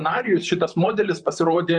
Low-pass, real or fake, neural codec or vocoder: 10.8 kHz; fake; vocoder, 44.1 kHz, 128 mel bands, Pupu-Vocoder